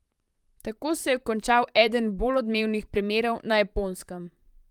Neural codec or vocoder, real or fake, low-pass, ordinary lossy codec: vocoder, 44.1 kHz, 128 mel bands, Pupu-Vocoder; fake; 19.8 kHz; Opus, 32 kbps